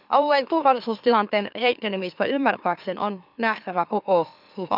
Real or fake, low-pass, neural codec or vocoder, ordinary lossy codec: fake; 5.4 kHz; autoencoder, 44.1 kHz, a latent of 192 numbers a frame, MeloTTS; none